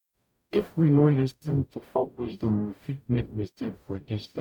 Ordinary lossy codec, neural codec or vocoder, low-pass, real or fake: none; codec, 44.1 kHz, 0.9 kbps, DAC; 19.8 kHz; fake